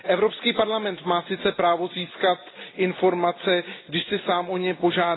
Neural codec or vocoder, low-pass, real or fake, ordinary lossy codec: none; 7.2 kHz; real; AAC, 16 kbps